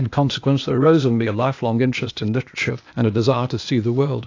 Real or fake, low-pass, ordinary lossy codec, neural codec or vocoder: fake; 7.2 kHz; AAC, 48 kbps; codec, 16 kHz, 0.8 kbps, ZipCodec